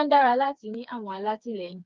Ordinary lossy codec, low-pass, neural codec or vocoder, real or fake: Opus, 32 kbps; 7.2 kHz; codec, 16 kHz, 4 kbps, FreqCodec, smaller model; fake